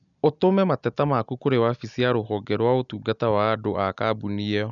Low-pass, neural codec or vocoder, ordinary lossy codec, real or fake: 7.2 kHz; none; none; real